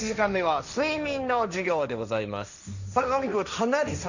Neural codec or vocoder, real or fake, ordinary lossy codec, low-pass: codec, 16 kHz, 1.1 kbps, Voila-Tokenizer; fake; none; 7.2 kHz